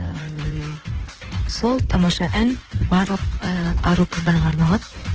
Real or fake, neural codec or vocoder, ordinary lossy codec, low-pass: fake; codec, 16 kHz in and 24 kHz out, 1.1 kbps, FireRedTTS-2 codec; Opus, 16 kbps; 7.2 kHz